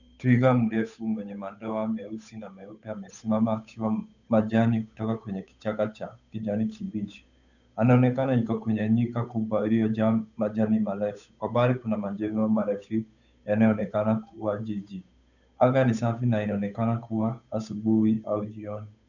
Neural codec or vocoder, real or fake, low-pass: codec, 16 kHz, 8 kbps, FunCodec, trained on Chinese and English, 25 frames a second; fake; 7.2 kHz